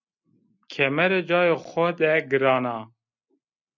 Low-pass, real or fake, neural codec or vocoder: 7.2 kHz; real; none